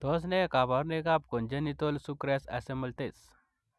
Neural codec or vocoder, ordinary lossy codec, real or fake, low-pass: none; none; real; none